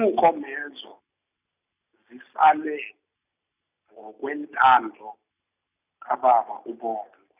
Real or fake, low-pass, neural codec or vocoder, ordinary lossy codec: real; 3.6 kHz; none; none